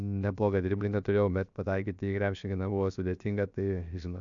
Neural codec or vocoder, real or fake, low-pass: codec, 16 kHz, 0.7 kbps, FocalCodec; fake; 7.2 kHz